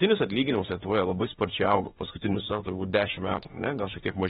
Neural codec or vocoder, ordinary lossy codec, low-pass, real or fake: autoencoder, 48 kHz, 32 numbers a frame, DAC-VAE, trained on Japanese speech; AAC, 16 kbps; 19.8 kHz; fake